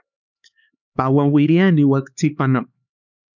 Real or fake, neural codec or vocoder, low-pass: fake; codec, 16 kHz, 4 kbps, X-Codec, HuBERT features, trained on LibriSpeech; 7.2 kHz